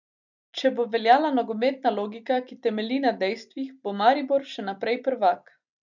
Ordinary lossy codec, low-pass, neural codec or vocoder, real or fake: none; 7.2 kHz; none; real